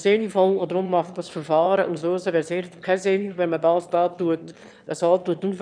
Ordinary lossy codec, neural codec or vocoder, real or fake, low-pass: none; autoencoder, 22.05 kHz, a latent of 192 numbers a frame, VITS, trained on one speaker; fake; 9.9 kHz